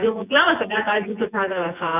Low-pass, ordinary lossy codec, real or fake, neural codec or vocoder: 3.6 kHz; none; fake; vocoder, 24 kHz, 100 mel bands, Vocos